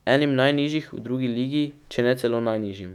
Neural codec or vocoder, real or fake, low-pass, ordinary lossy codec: autoencoder, 48 kHz, 128 numbers a frame, DAC-VAE, trained on Japanese speech; fake; 19.8 kHz; none